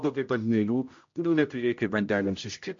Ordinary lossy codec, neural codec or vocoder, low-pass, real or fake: MP3, 48 kbps; codec, 16 kHz, 0.5 kbps, X-Codec, HuBERT features, trained on general audio; 7.2 kHz; fake